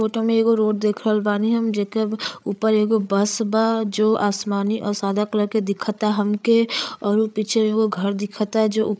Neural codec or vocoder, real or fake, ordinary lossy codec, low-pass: codec, 16 kHz, 8 kbps, FreqCodec, larger model; fake; none; none